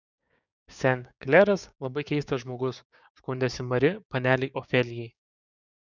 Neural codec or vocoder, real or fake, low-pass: codec, 16 kHz, 6 kbps, DAC; fake; 7.2 kHz